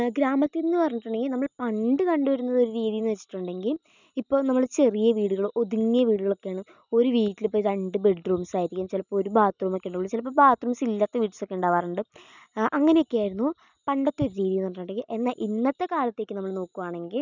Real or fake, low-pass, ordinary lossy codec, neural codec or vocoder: real; 7.2 kHz; none; none